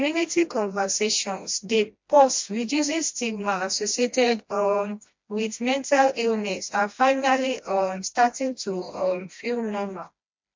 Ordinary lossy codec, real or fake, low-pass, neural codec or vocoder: MP3, 48 kbps; fake; 7.2 kHz; codec, 16 kHz, 1 kbps, FreqCodec, smaller model